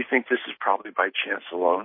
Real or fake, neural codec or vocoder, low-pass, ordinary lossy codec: real; none; 5.4 kHz; MP3, 24 kbps